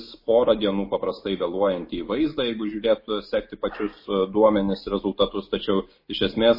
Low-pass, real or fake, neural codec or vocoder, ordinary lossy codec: 5.4 kHz; real; none; MP3, 24 kbps